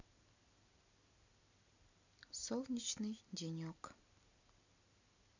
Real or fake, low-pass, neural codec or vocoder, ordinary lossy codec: real; 7.2 kHz; none; none